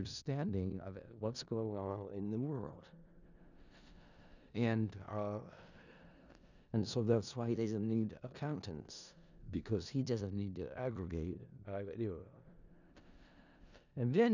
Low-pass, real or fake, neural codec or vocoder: 7.2 kHz; fake; codec, 16 kHz in and 24 kHz out, 0.4 kbps, LongCat-Audio-Codec, four codebook decoder